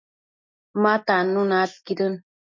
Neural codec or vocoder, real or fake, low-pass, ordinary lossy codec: none; real; 7.2 kHz; MP3, 48 kbps